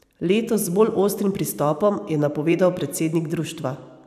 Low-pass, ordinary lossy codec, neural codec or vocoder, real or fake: 14.4 kHz; none; vocoder, 44.1 kHz, 128 mel bands every 512 samples, BigVGAN v2; fake